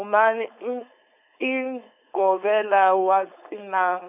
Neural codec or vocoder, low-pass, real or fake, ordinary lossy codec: codec, 16 kHz, 4.8 kbps, FACodec; 3.6 kHz; fake; none